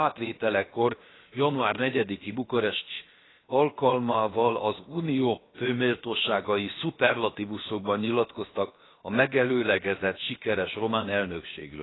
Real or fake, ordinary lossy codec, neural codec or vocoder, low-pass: fake; AAC, 16 kbps; codec, 16 kHz, about 1 kbps, DyCAST, with the encoder's durations; 7.2 kHz